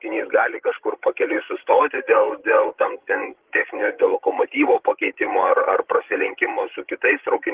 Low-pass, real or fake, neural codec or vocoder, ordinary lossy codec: 3.6 kHz; fake; codec, 16 kHz, 16 kbps, FreqCodec, larger model; Opus, 16 kbps